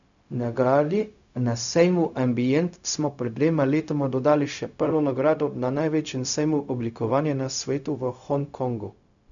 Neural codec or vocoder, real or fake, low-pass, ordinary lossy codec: codec, 16 kHz, 0.4 kbps, LongCat-Audio-Codec; fake; 7.2 kHz; none